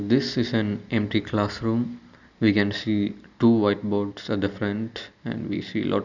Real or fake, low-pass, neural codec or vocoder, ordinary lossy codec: real; 7.2 kHz; none; none